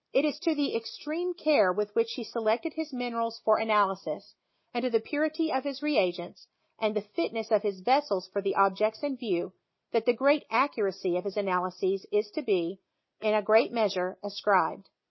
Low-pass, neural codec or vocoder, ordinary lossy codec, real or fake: 7.2 kHz; none; MP3, 24 kbps; real